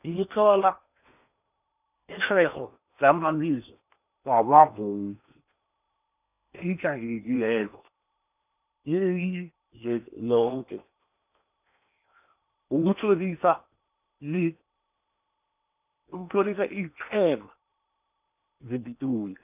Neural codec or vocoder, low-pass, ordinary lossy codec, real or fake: codec, 16 kHz in and 24 kHz out, 0.8 kbps, FocalCodec, streaming, 65536 codes; 3.6 kHz; none; fake